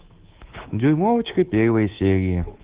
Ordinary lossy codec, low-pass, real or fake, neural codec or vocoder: Opus, 16 kbps; 3.6 kHz; fake; codec, 24 kHz, 3.1 kbps, DualCodec